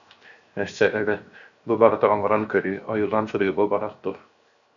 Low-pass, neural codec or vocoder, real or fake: 7.2 kHz; codec, 16 kHz, 0.7 kbps, FocalCodec; fake